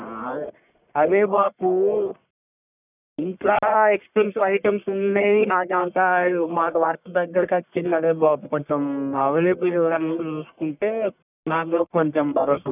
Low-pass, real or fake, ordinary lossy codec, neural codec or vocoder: 3.6 kHz; fake; none; codec, 44.1 kHz, 1.7 kbps, Pupu-Codec